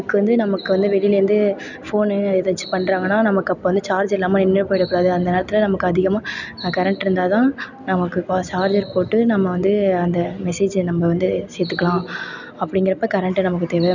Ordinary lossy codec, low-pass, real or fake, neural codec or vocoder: none; 7.2 kHz; real; none